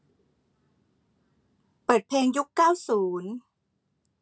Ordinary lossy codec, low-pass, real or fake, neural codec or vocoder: none; none; real; none